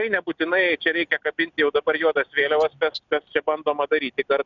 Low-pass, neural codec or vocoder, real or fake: 7.2 kHz; none; real